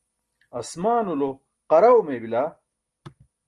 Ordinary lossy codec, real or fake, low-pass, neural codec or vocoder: Opus, 24 kbps; real; 10.8 kHz; none